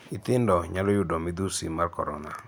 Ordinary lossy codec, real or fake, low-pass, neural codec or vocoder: none; real; none; none